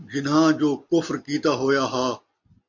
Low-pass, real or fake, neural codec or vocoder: 7.2 kHz; real; none